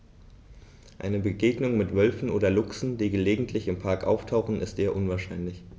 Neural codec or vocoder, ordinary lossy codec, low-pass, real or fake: none; none; none; real